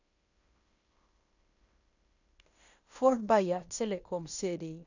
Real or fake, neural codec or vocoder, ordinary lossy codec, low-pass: fake; codec, 16 kHz in and 24 kHz out, 0.9 kbps, LongCat-Audio-Codec, fine tuned four codebook decoder; none; 7.2 kHz